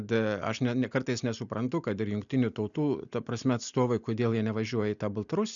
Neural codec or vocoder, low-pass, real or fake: none; 7.2 kHz; real